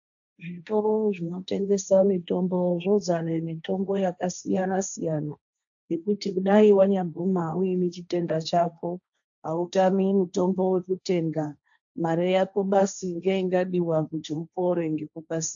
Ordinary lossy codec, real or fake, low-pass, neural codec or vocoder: AAC, 64 kbps; fake; 7.2 kHz; codec, 16 kHz, 1.1 kbps, Voila-Tokenizer